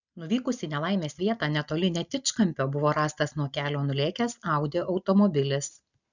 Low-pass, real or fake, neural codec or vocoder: 7.2 kHz; real; none